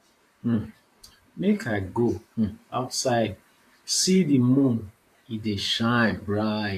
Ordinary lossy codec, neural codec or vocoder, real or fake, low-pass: AAC, 64 kbps; vocoder, 44.1 kHz, 128 mel bands, Pupu-Vocoder; fake; 14.4 kHz